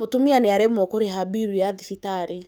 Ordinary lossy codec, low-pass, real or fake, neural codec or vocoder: none; none; fake; codec, 44.1 kHz, 7.8 kbps, DAC